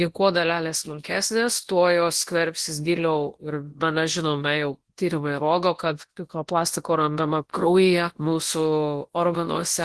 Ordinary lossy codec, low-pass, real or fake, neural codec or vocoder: Opus, 16 kbps; 10.8 kHz; fake; codec, 24 kHz, 0.9 kbps, WavTokenizer, large speech release